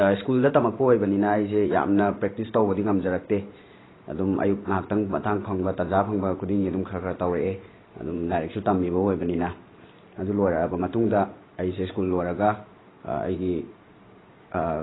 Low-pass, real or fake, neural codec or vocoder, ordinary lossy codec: 7.2 kHz; fake; vocoder, 44.1 kHz, 128 mel bands every 512 samples, BigVGAN v2; AAC, 16 kbps